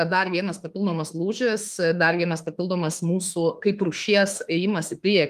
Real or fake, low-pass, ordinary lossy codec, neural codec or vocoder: fake; 14.4 kHz; Opus, 32 kbps; autoencoder, 48 kHz, 32 numbers a frame, DAC-VAE, trained on Japanese speech